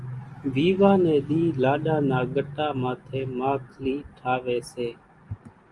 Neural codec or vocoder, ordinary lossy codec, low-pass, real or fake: none; Opus, 32 kbps; 10.8 kHz; real